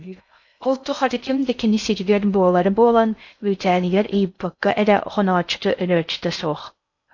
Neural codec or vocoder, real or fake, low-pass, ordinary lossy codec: codec, 16 kHz in and 24 kHz out, 0.6 kbps, FocalCodec, streaming, 2048 codes; fake; 7.2 kHz; AAC, 48 kbps